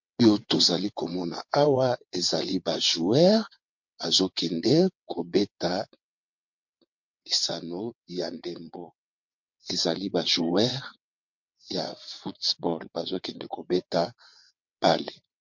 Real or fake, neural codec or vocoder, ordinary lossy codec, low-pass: fake; vocoder, 22.05 kHz, 80 mel bands, WaveNeXt; MP3, 48 kbps; 7.2 kHz